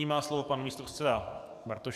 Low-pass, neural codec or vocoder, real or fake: 14.4 kHz; codec, 44.1 kHz, 7.8 kbps, DAC; fake